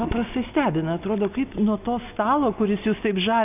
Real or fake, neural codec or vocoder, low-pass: real; none; 3.6 kHz